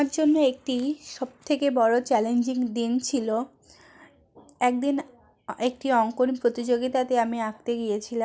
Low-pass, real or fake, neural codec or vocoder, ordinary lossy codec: none; real; none; none